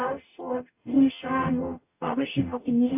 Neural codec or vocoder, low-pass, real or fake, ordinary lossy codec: codec, 44.1 kHz, 0.9 kbps, DAC; 3.6 kHz; fake; AAC, 32 kbps